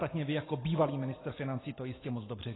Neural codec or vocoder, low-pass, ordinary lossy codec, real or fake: none; 7.2 kHz; AAC, 16 kbps; real